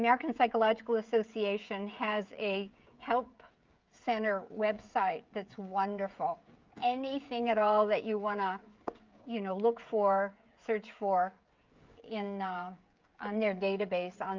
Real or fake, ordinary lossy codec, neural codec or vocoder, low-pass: fake; Opus, 24 kbps; codec, 16 kHz, 16 kbps, FreqCodec, smaller model; 7.2 kHz